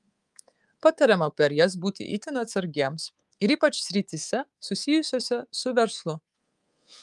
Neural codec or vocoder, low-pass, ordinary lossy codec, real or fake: codec, 24 kHz, 3.1 kbps, DualCodec; 10.8 kHz; Opus, 32 kbps; fake